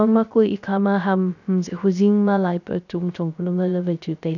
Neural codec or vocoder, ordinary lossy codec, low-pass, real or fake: codec, 16 kHz, 0.3 kbps, FocalCodec; none; 7.2 kHz; fake